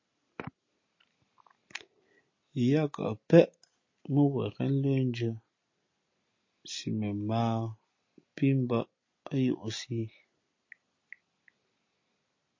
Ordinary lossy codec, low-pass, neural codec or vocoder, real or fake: MP3, 32 kbps; 7.2 kHz; none; real